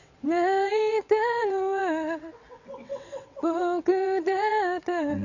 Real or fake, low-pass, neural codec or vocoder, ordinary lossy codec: fake; 7.2 kHz; vocoder, 22.05 kHz, 80 mel bands, WaveNeXt; none